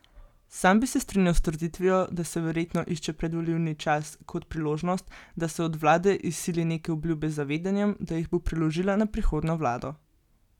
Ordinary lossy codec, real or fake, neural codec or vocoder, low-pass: none; real; none; 19.8 kHz